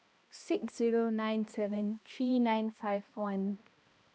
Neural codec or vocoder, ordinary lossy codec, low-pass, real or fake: codec, 16 kHz, 1 kbps, X-Codec, HuBERT features, trained on balanced general audio; none; none; fake